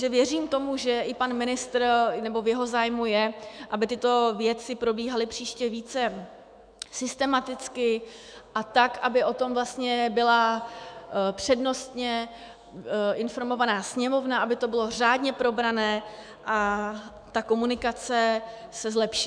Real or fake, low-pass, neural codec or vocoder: fake; 9.9 kHz; autoencoder, 48 kHz, 128 numbers a frame, DAC-VAE, trained on Japanese speech